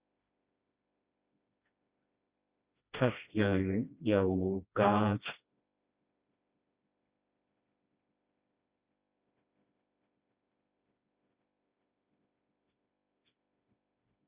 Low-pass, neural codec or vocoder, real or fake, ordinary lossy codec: 3.6 kHz; codec, 16 kHz, 1 kbps, FreqCodec, smaller model; fake; Opus, 64 kbps